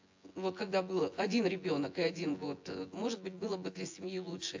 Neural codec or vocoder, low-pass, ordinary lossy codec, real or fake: vocoder, 24 kHz, 100 mel bands, Vocos; 7.2 kHz; AAC, 48 kbps; fake